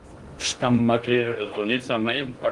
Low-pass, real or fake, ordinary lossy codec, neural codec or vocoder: 10.8 kHz; fake; Opus, 24 kbps; codec, 16 kHz in and 24 kHz out, 0.6 kbps, FocalCodec, streaming, 2048 codes